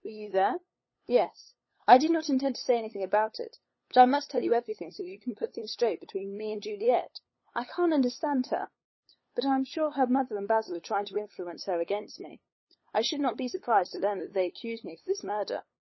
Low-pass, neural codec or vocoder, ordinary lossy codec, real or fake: 7.2 kHz; codec, 16 kHz, 8 kbps, FunCodec, trained on LibriTTS, 25 frames a second; MP3, 24 kbps; fake